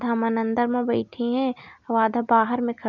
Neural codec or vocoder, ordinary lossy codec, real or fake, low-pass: none; none; real; 7.2 kHz